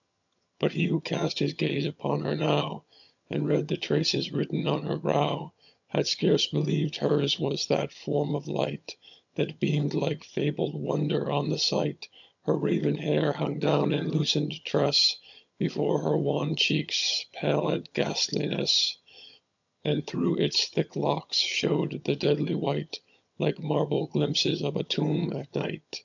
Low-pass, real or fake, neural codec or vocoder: 7.2 kHz; fake; vocoder, 22.05 kHz, 80 mel bands, HiFi-GAN